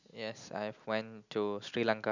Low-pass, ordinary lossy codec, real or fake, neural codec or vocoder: 7.2 kHz; none; real; none